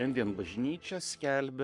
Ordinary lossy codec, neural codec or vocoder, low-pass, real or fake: MP3, 64 kbps; codec, 44.1 kHz, 7.8 kbps, Pupu-Codec; 10.8 kHz; fake